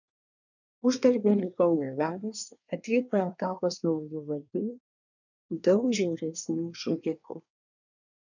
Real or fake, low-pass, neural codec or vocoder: fake; 7.2 kHz; codec, 24 kHz, 1 kbps, SNAC